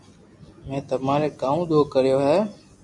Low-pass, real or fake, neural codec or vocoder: 10.8 kHz; real; none